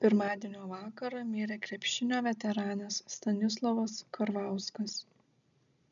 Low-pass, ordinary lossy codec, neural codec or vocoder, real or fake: 7.2 kHz; MP3, 96 kbps; none; real